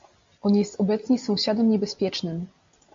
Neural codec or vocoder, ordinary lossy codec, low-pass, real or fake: none; MP3, 48 kbps; 7.2 kHz; real